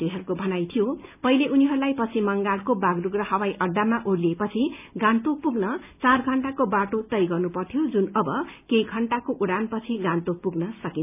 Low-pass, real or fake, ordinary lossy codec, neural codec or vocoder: 3.6 kHz; real; none; none